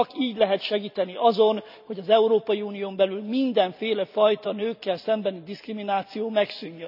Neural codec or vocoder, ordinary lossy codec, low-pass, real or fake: none; none; 5.4 kHz; real